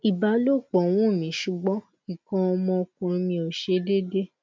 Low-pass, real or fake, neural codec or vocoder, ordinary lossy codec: none; real; none; none